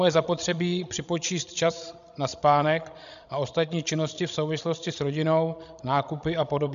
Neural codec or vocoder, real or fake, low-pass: codec, 16 kHz, 16 kbps, FreqCodec, larger model; fake; 7.2 kHz